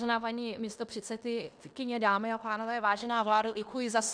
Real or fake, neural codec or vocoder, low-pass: fake; codec, 16 kHz in and 24 kHz out, 0.9 kbps, LongCat-Audio-Codec, fine tuned four codebook decoder; 9.9 kHz